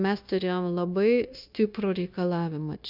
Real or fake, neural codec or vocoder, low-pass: fake; codec, 16 kHz, 0.9 kbps, LongCat-Audio-Codec; 5.4 kHz